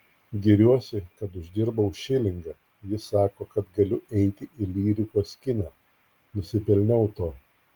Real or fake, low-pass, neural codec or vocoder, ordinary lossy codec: real; 19.8 kHz; none; Opus, 24 kbps